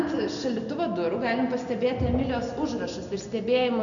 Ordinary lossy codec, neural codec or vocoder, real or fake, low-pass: MP3, 96 kbps; none; real; 7.2 kHz